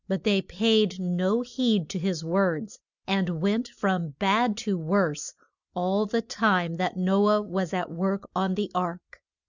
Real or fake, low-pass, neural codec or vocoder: real; 7.2 kHz; none